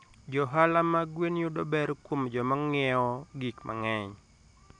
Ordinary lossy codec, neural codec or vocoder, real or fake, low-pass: none; none; real; 9.9 kHz